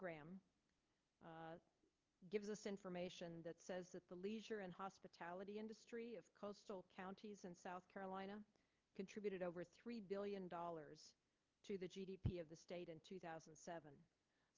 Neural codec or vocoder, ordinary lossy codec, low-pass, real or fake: codec, 16 kHz, 8 kbps, FunCodec, trained on LibriTTS, 25 frames a second; Opus, 32 kbps; 7.2 kHz; fake